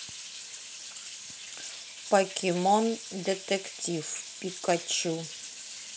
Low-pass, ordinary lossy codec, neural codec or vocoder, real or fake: none; none; none; real